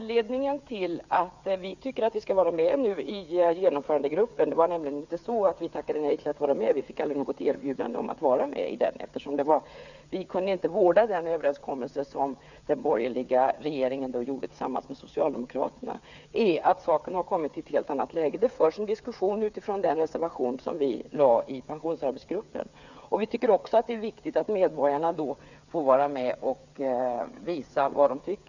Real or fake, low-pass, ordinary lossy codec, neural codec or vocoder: fake; 7.2 kHz; none; codec, 16 kHz, 8 kbps, FreqCodec, smaller model